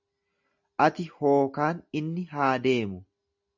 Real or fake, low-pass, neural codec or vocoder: real; 7.2 kHz; none